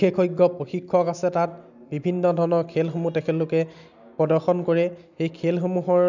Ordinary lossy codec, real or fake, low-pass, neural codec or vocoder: none; real; 7.2 kHz; none